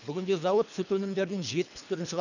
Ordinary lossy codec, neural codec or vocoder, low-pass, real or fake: none; codec, 24 kHz, 3 kbps, HILCodec; 7.2 kHz; fake